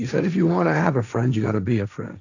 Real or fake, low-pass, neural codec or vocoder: fake; 7.2 kHz; codec, 16 kHz, 1.1 kbps, Voila-Tokenizer